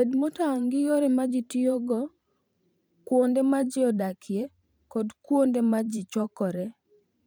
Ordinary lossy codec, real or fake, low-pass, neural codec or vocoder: none; fake; none; vocoder, 44.1 kHz, 128 mel bands every 512 samples, BigVGAN v2